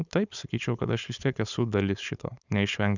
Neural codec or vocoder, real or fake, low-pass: codec, 16 kHz, 4.8 kbps, FACodec; fake; 7.2 kHz